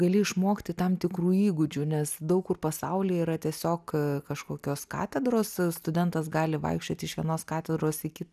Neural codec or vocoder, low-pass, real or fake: none; 14.4 kHz; real